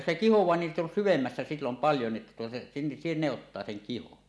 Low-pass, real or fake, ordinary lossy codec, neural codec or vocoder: none; real; none; none